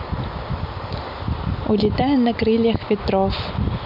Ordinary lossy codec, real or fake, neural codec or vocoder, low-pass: MP3, 48 kbps; real; none; 5.4 kHz